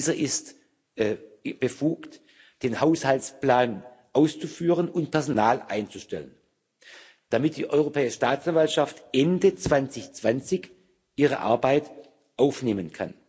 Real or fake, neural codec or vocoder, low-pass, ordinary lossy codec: real; none; none; none